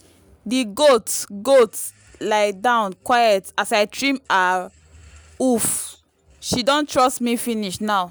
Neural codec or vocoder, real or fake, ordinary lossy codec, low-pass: none; real; none; none